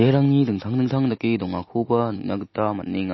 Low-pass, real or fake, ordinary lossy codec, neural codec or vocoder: 7.2 kHz; real; MP3, 24 kbps; none